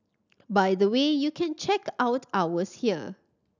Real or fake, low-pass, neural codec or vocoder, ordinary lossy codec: real; 7.2 kHz; none; none